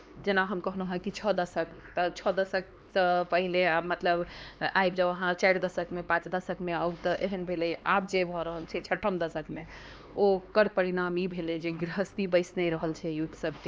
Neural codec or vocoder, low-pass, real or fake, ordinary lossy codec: codec, 16 kHz, 2 kbps, X-Codec, HuBERT features, trained on LibriSpeech; none; fake; none